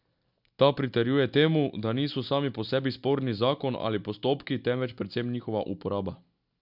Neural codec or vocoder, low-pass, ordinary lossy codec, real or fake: none; 5.4 kHz; none; real